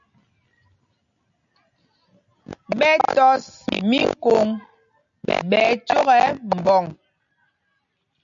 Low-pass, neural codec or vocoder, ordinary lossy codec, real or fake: 7.2 kHz; none; MP3, 96 kbps; real